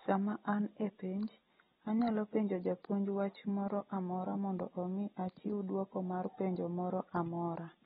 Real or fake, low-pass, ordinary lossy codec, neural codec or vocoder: real; 19.8 kHz; AAC, 16 kbps; none